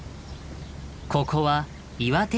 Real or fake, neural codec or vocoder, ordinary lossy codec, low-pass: real; none; none; none